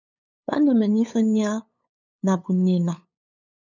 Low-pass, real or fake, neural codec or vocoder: 7.2 kHz; fake; codec, 16 kHz, 8 kbps, FunCodec, trained on LibriTTS, 25 frames a second